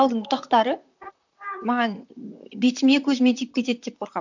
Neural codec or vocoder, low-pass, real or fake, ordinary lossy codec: vocoder, 44.1 kHz, 128 mel bands, Pupu-Vocoder; 7.2 kHz; fake; none